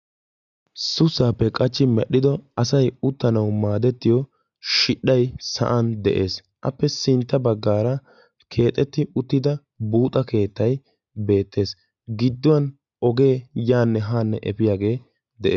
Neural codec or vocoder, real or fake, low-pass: none; real; 7.2 kHz